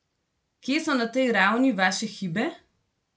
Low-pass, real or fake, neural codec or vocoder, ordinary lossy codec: none; real; none; none